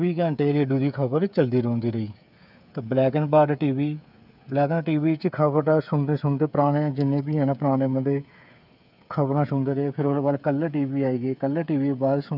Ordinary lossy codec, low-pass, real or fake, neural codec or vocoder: none; 5.4 kHz; fake; codec, 16 kHz, 8 kbps, FreqCodec, smaller model